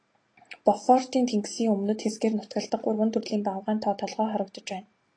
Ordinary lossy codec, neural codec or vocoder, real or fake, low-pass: AAC, 32 kbps; none; real; 9.9 kHz